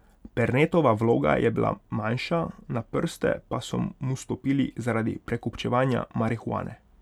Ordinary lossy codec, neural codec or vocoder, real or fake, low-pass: none; none; real; 19.8 kHz